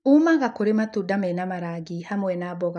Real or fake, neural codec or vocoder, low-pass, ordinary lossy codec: real; none; 9.9 kHz; none